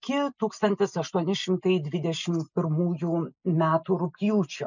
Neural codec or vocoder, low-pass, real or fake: none; 7.2 kHz; real